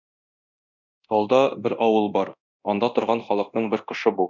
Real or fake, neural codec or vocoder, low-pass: fake; codec, 24 kHz, 0.9 kbps, DualCodec; 7.2 kHz